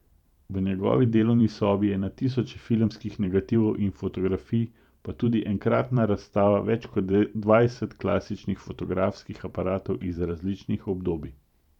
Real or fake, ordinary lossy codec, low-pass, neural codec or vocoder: fake; none; 19.8 kHz; vocoder, 44.1 kHz, 128 mel bands every 512 samples, BigVGAN v2